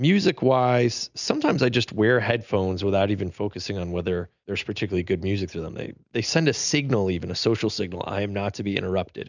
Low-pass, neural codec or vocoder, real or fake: 7.2 kHz; none; real